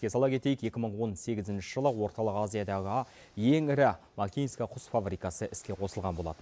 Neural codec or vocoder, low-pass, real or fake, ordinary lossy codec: none; none; real; none